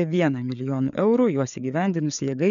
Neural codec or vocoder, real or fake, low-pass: codec, 16 kHz, 4 kbps, FreqCodec, larger model; fake; 7.2 kHz